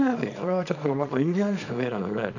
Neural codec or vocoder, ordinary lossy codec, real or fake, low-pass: codec, 24 kHz, 0.9 kbps, WavTokenizer, small release; none; fake; 7.2 kHz